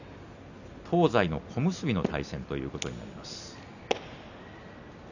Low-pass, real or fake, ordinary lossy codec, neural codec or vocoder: 7.2 kHz; real; none; none